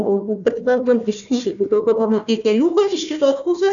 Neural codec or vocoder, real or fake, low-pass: codec, 16 kHz, 1 kbps, FunCodec, trained on Chinese and English, 50 frames a second; fake; 7.2 kHz